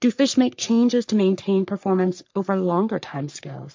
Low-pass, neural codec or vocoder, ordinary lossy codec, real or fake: 7.2 kHz; codec, 44.1 kHz, 3.4 kbps, Pupu-Codec; MP3, 48 kbps; fake